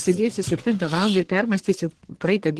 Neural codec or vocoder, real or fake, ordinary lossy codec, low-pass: codec, 44.1 kHz, 1.7 kbps, Pupu-Codec; fake; Opus, 16 kbps; 10.8 kHz